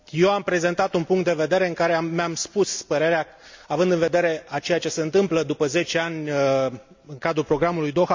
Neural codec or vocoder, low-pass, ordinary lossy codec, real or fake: none; 7.2 kHz; MP3, 64 kbps; real